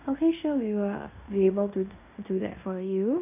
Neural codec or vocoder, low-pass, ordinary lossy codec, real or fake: codec, 16 kHz in and 24 kHz out, 0.9 kbps, LongCat-Audio-Codec, fine tuned four codebook decoder; 3.6 kHz; none; fake